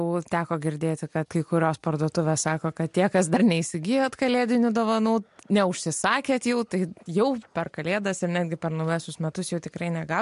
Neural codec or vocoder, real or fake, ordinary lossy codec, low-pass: none; real; MP3, 64 kbps; 10.8 kHz